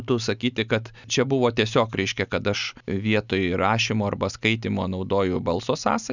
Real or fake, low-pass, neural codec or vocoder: real; 7.2 kHz; none